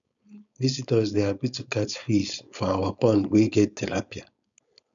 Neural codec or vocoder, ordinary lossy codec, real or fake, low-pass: codec, 16 kHz, 4.8 kbps, FACodec; none; fake; 7.2 kHz